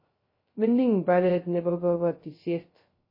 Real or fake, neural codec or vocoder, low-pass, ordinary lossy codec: fake; codec, 16 kHz, 0.2 kbps, FocalCodec; 5.4 kHz; MP3, 24 kbps